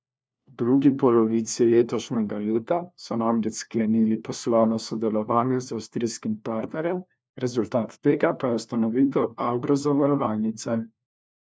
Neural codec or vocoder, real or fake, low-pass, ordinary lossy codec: codec, 16 kHz, 1 kbps, FunCodec, trained on LibriTTS, 50 frames a second; fake; none; none